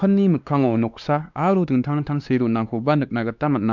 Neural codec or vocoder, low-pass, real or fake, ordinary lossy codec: codec, 16 kHz, 2 kbps, X-Codec, WavLM features, trained on Multilingual LibriSpeech; 7.2 kHz; fake; none